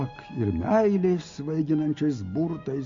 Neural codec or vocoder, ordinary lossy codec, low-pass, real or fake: none; MP3, 48 kbps; 7.2 kHz; real